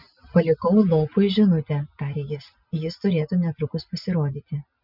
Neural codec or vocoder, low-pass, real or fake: none; 5.4 kHz; real